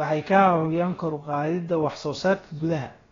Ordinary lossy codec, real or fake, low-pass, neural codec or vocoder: AAC, 32 kbps; fake; 7.2 kHz; codec, 16 kHz, about 1 kbps, DyCAST, with the encoder's durations